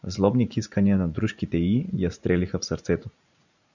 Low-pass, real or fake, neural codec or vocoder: 7.2 kHz; fake; vocoder, 44.1 kHz, 80 mel bands, Vocos